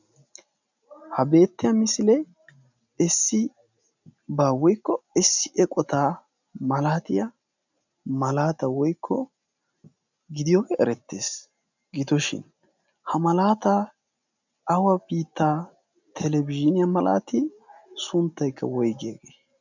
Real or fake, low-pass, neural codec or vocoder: real; 7.2 kHz; none